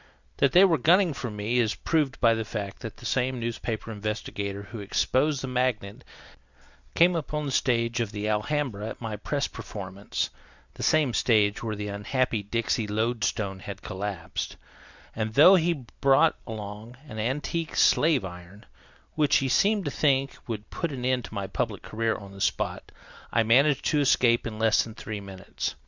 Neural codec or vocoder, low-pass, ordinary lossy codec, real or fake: none; 7.2 kHz; Opus, 64 kbps; real